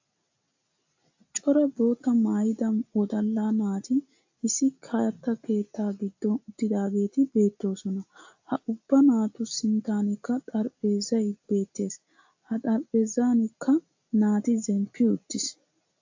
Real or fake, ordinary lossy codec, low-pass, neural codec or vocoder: real; AAC, 48 kbps; 7.2 kHz; none